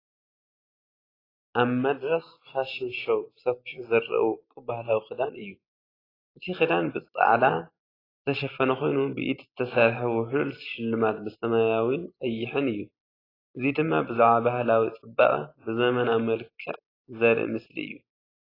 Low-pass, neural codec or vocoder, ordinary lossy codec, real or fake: 5.4 kHz; none; AAC, 24 kbps; real